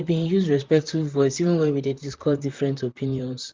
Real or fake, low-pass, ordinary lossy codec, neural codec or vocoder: fake; 7.2 kHz; Opus, 32 kbps; vocoder, 44.1 kHz, 128 mel bands, Pupu-Vocoder